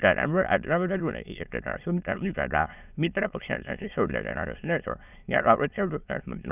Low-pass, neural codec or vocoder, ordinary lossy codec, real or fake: 3.6 kHz; autoencoder, 22.05 kHz, a latent of 192 numbers a frame, VITS, trained on many speakers; none; fake